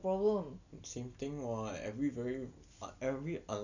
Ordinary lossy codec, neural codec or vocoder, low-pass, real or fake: none; none; 7.2 kHz; real